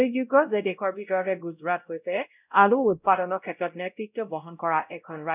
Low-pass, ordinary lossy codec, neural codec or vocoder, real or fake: 3.6 kHz; none; codec, 16 kHz, 0.5 kbps, X-Codec, WavLM features, trained on Multilingual LibriSpeech; fake